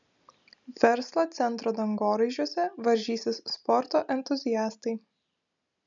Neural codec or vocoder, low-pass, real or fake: none; 7.2 kHz; real